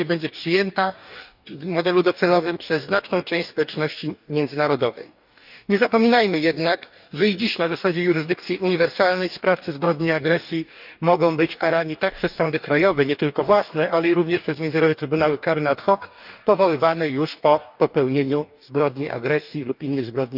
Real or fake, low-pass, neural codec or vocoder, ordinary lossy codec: fake; 5.4 kHz; codec, 44.1 kHz, 2.6 kbps, DAC; none